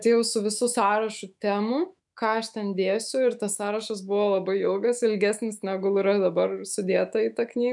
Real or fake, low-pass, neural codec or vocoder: fake; 10.8 kHz; autoencoder, 48 kHz, 128 numbers a frame, DAC-VAE, trained on Japanese speech